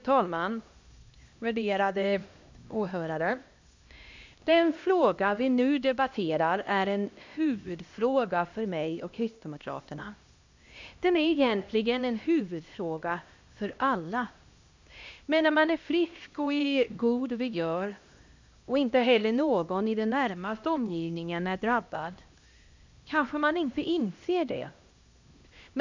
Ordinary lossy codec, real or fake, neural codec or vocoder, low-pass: MP3, 64 kbps; fake; codec, 16 kHz, 1 kbps, X-Codec, HuBERT features, trained on LibriSpeech; 7.2 kHz